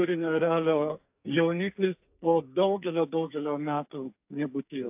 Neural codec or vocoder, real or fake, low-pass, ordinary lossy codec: codec, 44.1 kHz, 2.6 kbps, SNAC; fake; 3.6 kHz; AAC, 32 kbps